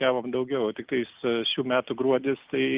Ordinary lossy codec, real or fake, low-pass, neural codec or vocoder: Opus, 24 kbps; real; 3.6 kHz; none